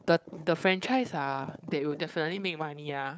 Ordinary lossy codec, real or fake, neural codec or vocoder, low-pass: none; fake; codec, 16 kHz, 4 kbps, FunCodec, trained on Chinese and English, 50 frames a second; none